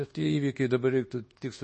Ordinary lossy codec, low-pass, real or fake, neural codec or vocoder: MP3, 32 kbps; 10.8 kHz; fake; codec, 24 kHz, 1.2 kbps, DualCodec